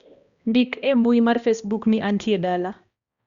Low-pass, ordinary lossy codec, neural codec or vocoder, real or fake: 7.2 kHz; Opus, 64 kbps; codec, 16 kHz, 1 kbps, X-Codec, HuBERT features, trained on balanced general audio; fake